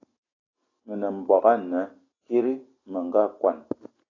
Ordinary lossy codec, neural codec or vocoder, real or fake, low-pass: AAC, 32 kbps; none; real; 7.2 kHz